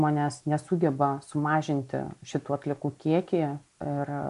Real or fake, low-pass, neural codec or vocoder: real; 10.8 kHz; none